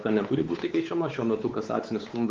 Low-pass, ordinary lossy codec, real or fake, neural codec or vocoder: 7.2 kHz; Opus, 16 kbps; fake; codec, 16 kHz, 4 kbps, X-Codec, WavLM features, trained on Multilingual LibriSpeech